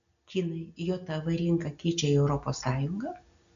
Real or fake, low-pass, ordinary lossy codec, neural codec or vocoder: real; 7.2 kHz; AAC, 96 kbps; none